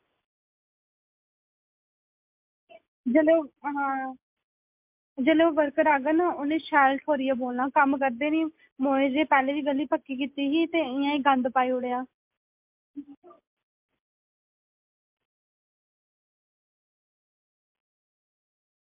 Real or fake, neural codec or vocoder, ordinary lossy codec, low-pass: real; none; MP3, 32 kbps; 3.6 kHz